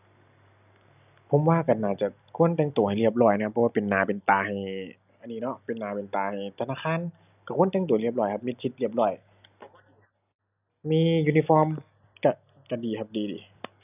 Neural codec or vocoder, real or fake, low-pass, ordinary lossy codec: none; real; 3.6 kHz; none